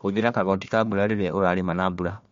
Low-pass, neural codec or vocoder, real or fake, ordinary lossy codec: 7.2 kHz; codec, 16 kHz, 1 kbps, FunCodec, trained on Chinese and English, 50 frames a second; fake; MP3, 48 kbps